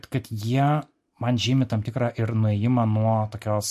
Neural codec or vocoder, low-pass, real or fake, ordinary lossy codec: none; 14.4 kHz; real; MP3, 64 kbps